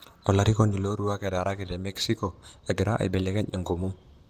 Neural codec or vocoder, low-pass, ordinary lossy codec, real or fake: vocoder, 44.1 kHz, 128 mel bands, Pupu-Vocoder; 14.4 kHz; Opus, 32 kbps; fake